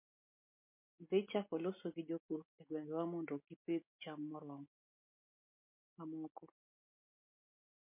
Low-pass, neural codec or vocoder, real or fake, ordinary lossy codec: 3.6 kHz; none; real; MP3, 32 kbps